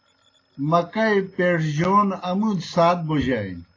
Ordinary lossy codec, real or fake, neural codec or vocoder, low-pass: AAC, 32 kbps; real; none; 7.2 kHz